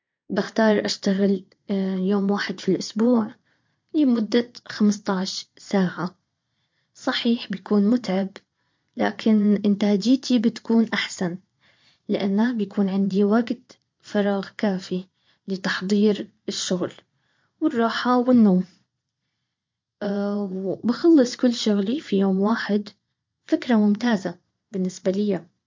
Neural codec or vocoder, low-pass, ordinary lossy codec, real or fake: vocoder, 22.05 kHz, 80 mel bands, Vocos; 7.2 kHz; MP3, 48 kbps; fake